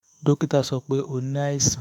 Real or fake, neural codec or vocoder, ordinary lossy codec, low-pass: fake; autoencoder, 48 kHz, 32 numbers a frame, DAC-VAE, trained on Japanese speech; none; none